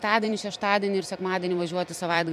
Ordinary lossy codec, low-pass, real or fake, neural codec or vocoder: MP3, 96 kbps; 14.4 kHz; fake; vocoder, 44.1 kHz, 128 mel bands every 256 samples, BigVGAN v2